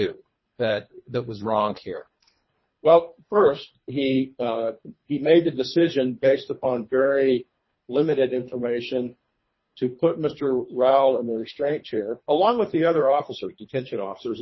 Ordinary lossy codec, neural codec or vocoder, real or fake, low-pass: MP3, 24 kbps; codec, 24 kHz, 3 kbps, HILCodec; fake; 7.2 kHz